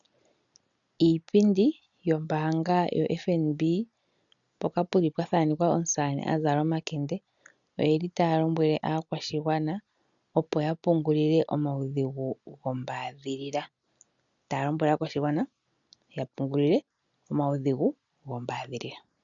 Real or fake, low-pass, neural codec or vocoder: real; 7.2 kHz; none